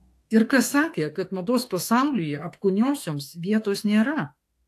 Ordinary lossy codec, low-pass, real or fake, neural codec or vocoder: AAC, 64 kbps; 14.4 kHz; fake; autoencoder, 48 kHz, 32 numbers a frame, DAC-VAE, trained on Japanese speech